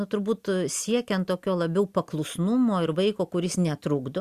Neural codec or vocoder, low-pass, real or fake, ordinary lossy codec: none; 14.4 kHz; real; Opus, 64 kbps